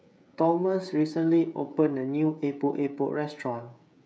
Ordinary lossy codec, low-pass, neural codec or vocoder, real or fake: none; none; codec, 16 kHz, 16 kbps, FreqCodec, smaller model; fake